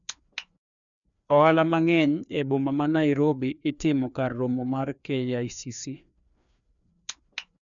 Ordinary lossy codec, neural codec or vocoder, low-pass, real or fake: none; codec, 16 kHz, 2 kbps, FreqCodec, larger model; 7.2 kHz; fake